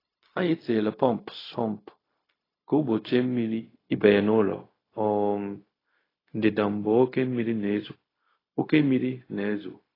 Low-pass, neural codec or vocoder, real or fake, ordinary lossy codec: 5.4 kHz; codec, 16 kHz, 0.4 kbps, LongCat-Audio-Codec; fake; AAC, 24 kbps